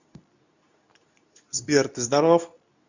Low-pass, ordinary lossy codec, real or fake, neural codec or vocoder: 7.2 kHz; none; fake; codec, 24 kHz, 0.9 kbps, WavTokenizer, medium speech release version 2